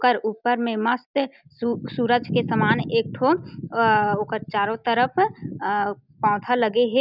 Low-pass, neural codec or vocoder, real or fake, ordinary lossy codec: 5.4 kHz; none; real; none